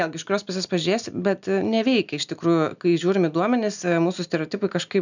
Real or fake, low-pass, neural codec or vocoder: real; 7.2 kHz; none